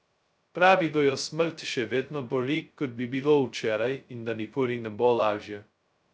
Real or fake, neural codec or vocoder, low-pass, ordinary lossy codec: fake; codec, 16 kHz, 0.2 kbps, FocalCodec; none; none